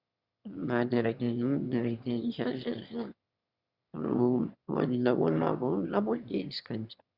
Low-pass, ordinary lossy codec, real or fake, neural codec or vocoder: 5.4 kHz; Opus, 64 kbps; fake; autoencoder, 22.05 kHz, a latent of 192 numbers a frame, VITS, trained on one speaker